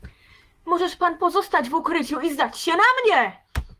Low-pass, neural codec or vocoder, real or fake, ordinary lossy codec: 14.4 kHz; autoencoder, 48 kHz, 128 numbers a frame, DAC-VAE, trained on Japanese speech; fake; Opus, 24 kbps